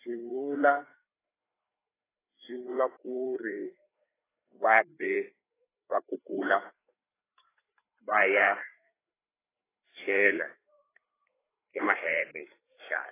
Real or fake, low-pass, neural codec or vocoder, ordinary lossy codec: fake; 3.6 kHz; codec, 16 kHz, 4 kbps, FreqCodec, larger model; AAC, 16 kbps